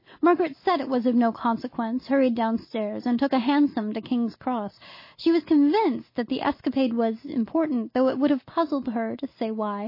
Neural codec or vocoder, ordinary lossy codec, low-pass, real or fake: none; MP3, 24 kbps; 5.4 kHz; real